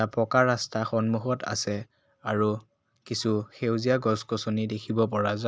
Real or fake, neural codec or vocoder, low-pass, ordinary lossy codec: real; none; none; none